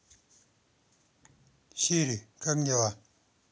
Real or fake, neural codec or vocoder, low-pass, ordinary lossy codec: real; none; none; none